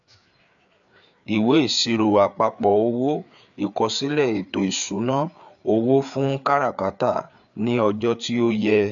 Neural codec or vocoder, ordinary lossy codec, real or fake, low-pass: codec, 16 kHz, 4 kbps, FreqCodec, larger model; none; fake; 7.2 kHz